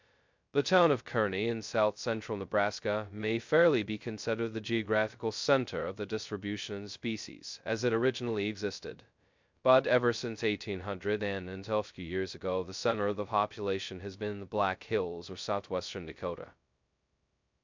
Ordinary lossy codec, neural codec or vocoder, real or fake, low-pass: MP3, 64 kbps; codec, 16 kHz, 0.2 kbps, FocalCodec; fake; 7.2 kHz